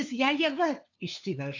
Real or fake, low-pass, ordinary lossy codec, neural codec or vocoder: fake; 7.2 kHz; AAC, 48 kbps; codec, 16 kHz, 2 kbps, FunCodec, trained on LibriTTS, 25 frames a second